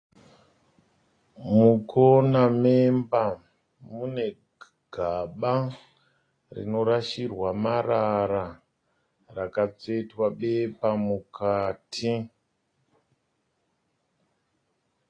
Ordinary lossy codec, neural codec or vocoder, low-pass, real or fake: AAC, 32 kbps; none; 9.9 kHz; real